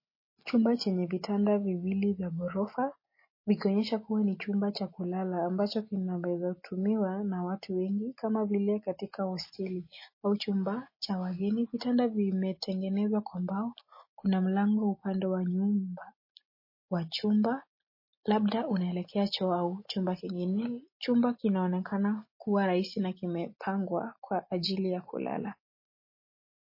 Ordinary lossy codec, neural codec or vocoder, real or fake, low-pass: MP3, 24 kbps; none; real; 5.4 kHz